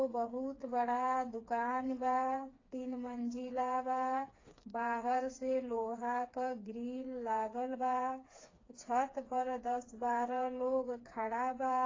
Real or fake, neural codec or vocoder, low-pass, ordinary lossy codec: fake; codec, 16 kHz, 4 kbps, FreqCodec, smaller model; 7.2 kHz; AAC, 32 kbps